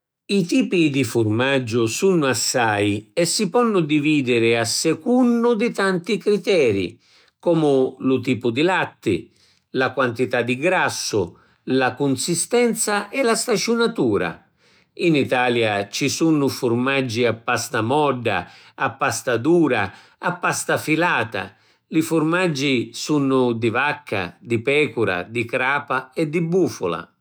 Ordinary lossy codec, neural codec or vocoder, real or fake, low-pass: none; autoencoder, 48 kHz, 128 numbers a frame, DAC-VAE, trained on Japanese speech; fake; none